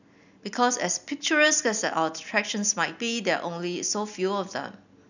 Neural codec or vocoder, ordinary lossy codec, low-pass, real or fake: none; none; 7.2 kHz; real